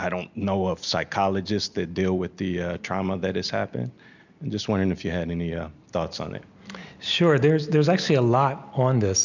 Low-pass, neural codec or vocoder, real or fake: 7.2 kHz; none; real